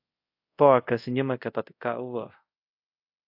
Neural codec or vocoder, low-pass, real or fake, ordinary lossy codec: codec, 24 kHz, 0.5 kbps, DualCodec; 5.4 kHz; fake; AAC, 48 kbps